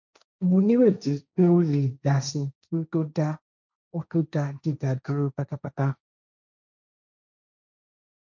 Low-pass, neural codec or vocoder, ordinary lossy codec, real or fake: 7.2 kHz; codec, 16 kHz, 1.1 kbps, Voila-Tokenizer; none; fake